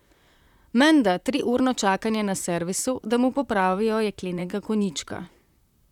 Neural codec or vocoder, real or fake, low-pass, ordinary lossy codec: vocoder, 44.1 kHz, 128 mel bands, Pupu-Vocoder; fake; 19.8 kHz; none